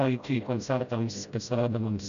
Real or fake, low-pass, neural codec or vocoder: fake; 7.2 kHz; codec, 16 kHz, 0.5 kbps, FreqCodec, smaller model